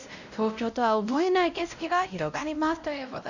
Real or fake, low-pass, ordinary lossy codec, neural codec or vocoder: fake; 7.2 kHz; none; codec, 16 kHz, 0.5 kbps, X-Codec, WavLM features, trained on Multilingual LibriSpeech